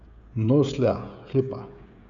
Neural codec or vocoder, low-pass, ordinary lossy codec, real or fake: codec, 16 kHz, 16 kbps, FreqCodec, smaller model; 7.2 kHz; none; fake